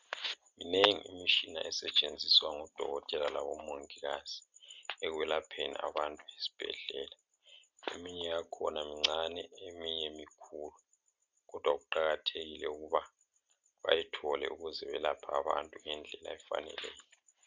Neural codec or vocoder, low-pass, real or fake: none; 7.2 kHz; real